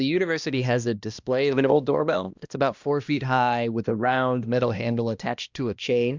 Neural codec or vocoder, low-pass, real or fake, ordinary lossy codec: codec, 16 kHz, 1 kbps, X-Codec, HuBERT features, trained on balanced general audio; 7.2 kHz; fake; Opus, 64 kbps